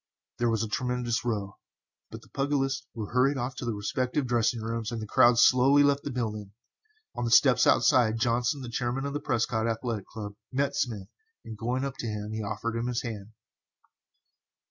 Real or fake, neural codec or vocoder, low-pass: real; none; 7.2 kHz